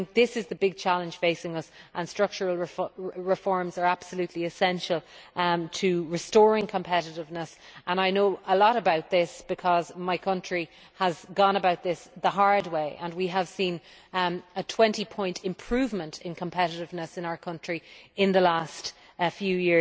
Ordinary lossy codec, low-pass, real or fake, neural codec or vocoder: none; none; real; none